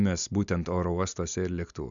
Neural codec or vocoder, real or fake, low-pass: none; real; 7.2 kHz